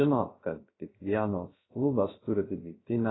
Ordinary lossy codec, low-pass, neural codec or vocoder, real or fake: AAC, 16 kbps; 7.2 kHz; codec, 16 kHz, 0.3 kbps, FocalCodec; fake